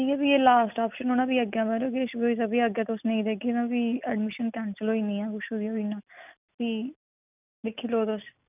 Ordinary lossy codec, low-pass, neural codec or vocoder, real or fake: none; 3.6 kHz; none; real